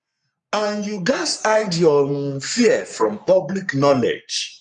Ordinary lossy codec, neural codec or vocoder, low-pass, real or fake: none; codec, 44.1 kHz, 3.4 kbps, Pupu-Codec; 10.8 kHz; fake